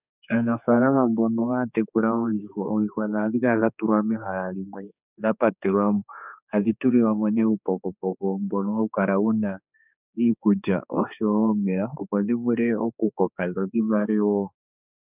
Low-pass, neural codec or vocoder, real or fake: 3.6 kHz; codec, 16 kHz, 4 kbps, X-Codec, HuBERT features, trained on general audio; fake